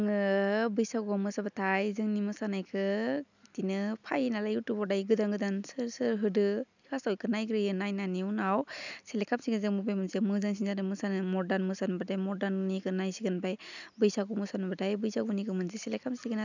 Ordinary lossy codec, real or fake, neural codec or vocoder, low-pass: none; real; none; 7.2 kHz